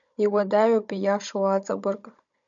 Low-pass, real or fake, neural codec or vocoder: 7.2 kHz; fake; codec, 16 kHz, 16 kbps, FunCodec, trained on Chinese and English, 50 frames a second